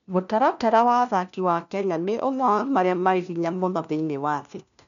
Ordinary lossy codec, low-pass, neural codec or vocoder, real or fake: none; 7.2 kHz; codec, 16 kHz, 1 kbps, FunCodec, trained on LibriTTS, 50 frames a second; fake